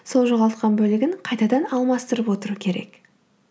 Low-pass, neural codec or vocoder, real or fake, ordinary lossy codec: none; none; real; none